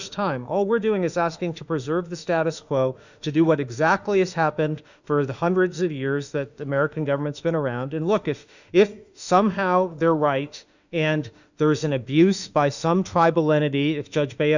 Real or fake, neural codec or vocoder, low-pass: fake; autoencoder, 48 kHz, 32 numbers a frame, DAC-VAE, trained on Japanese speech; 7.2 kHz